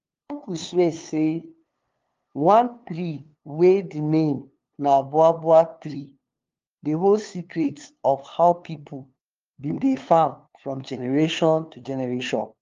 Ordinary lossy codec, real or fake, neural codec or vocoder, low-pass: Opus, 32 kbps; fake; codec, 16 kHz, 2 kbps, FunCodec, trained on LibriTTS, 25 frames a second; 7.2 kHz